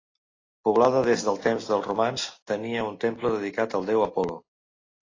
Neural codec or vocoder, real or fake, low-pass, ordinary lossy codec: none; real; 7.2 kHz; AAC, 32 kbps